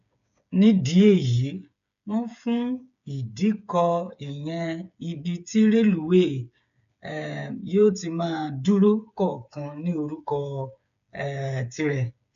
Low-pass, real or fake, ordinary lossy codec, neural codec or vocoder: 7.2 kHz; fake; AAC, 96 kbps; codec, 16 kHz, 8 kbps, FreqCodec, smaller model